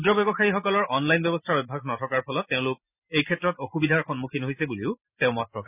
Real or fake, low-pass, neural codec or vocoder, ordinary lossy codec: real; 3.6 kHz; none; none